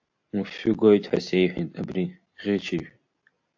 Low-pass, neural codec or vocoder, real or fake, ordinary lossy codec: 7.2 kHz; none; real; AAC, 48 kbps